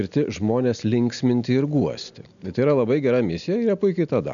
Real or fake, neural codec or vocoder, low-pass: real; none; 7.2 kHz